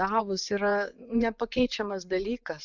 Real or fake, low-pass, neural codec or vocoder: fake; 7.2 kHz; vocoder, 24 kHz, 100 mel bands, Vocos